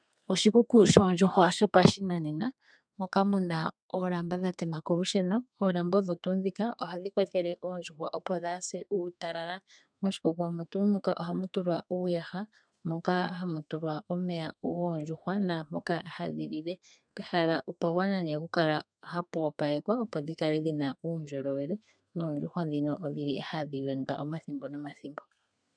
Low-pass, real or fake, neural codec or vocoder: 9.9 kHz; fake; codec, 32 kHz, 1.9 kbps, SNAC